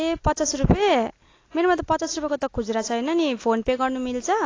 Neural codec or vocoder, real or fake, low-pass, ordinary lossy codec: none; real; 7.2 kHz; AAC, 32 kbps